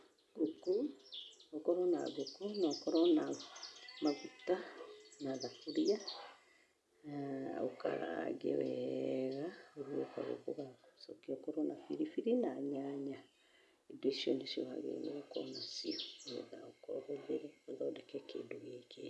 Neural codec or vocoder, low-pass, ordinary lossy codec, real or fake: none; none; none; real